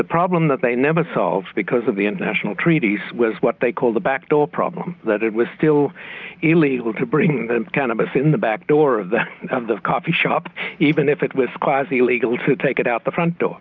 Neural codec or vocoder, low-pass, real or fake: none; 7.2 kHz; real